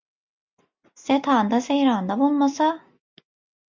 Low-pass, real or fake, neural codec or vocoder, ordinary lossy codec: 7.2 kHz; real; none; MP3, 64 kbps